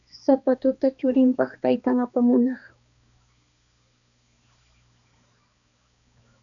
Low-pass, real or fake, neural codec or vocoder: 7.2 kHz; fake; codec, 16 kHz, 2 kbps, X-Codec, HuBERT features, trained on balanced general audio